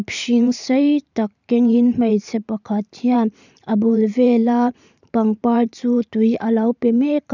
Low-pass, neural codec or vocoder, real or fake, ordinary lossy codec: 7.2 kHz; vocoder, 44.1 kHz, 128 mel bands every 512 samples, BigVGAN v2; fake; none